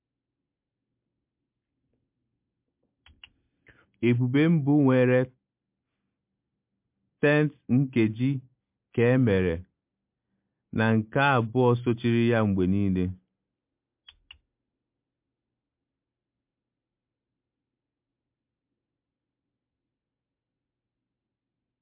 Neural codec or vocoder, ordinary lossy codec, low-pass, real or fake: none; MP3, 32 kbps; 3.6 kHz; real